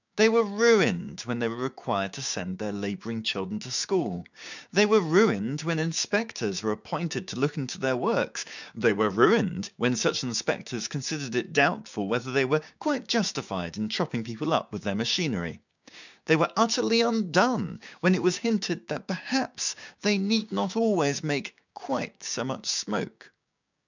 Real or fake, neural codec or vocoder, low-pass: fake; codec, 16 kHz, 6 kbps, DAC; 7.2 kHz